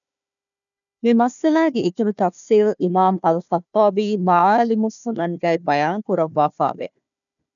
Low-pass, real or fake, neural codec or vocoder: 7.2 kHz; fake; codec, 16 kHz, 1 kbps, FunCodec, trained on Chinese and English, 50 frames a second